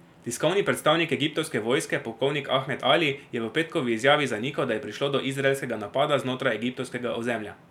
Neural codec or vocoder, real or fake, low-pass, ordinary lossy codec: none; real; 19.8 kHz; none